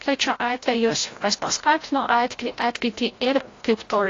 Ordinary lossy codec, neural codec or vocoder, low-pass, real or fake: AAC, 32 kbps; codec, 16 kHz, 0.5 kbps, FreqCodec, larger model; 7.2 kHz; fake